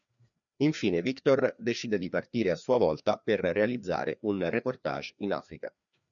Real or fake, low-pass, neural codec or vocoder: fake; 7.2 kHz; codec, 16 kHz, 2 kbps, FreqCodec, larger model